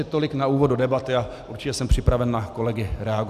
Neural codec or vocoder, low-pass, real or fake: none; 14.4 kHz; real